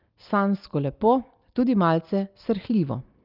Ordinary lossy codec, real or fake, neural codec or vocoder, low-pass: Opus, 32 kbps; real; none; 5.4 kHz